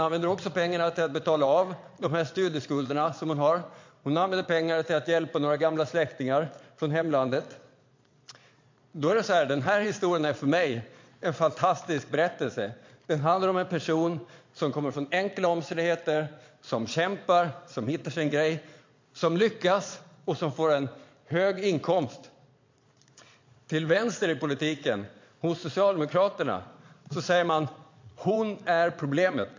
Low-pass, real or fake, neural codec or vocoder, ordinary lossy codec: 7.2 kHz; fake; vocoder, 22.05 kHz, 80 mel bands, Vocos; MP3, 48 kbps